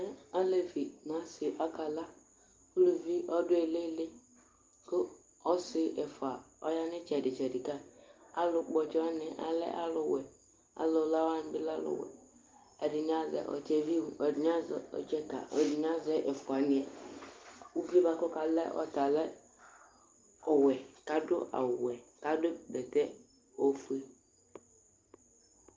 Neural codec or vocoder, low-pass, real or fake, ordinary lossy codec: none; 7.2 kHz; real; Opus, 32 kbps